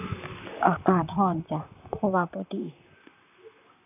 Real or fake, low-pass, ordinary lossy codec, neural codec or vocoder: fake; 3.6 kHz; none; vocoder, 44.1 kHz, 128 mel bands, Pupu-Vocoder